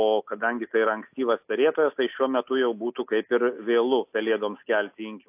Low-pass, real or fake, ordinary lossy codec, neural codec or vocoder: 3.6 kHz; real; AAC, 32 kbps; none